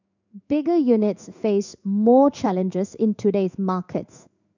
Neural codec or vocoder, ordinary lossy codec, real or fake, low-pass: codec, 16 kHz in and 24 kHz out, 1 kbps, XY-Tokenizer; none; fake; 7.2 kHz